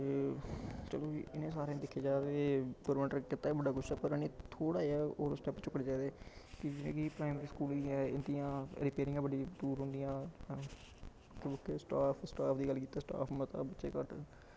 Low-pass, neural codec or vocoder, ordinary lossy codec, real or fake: none; none; none; real